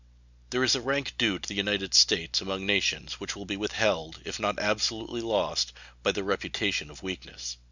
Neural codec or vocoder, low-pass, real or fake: none; 7.2 kHz; real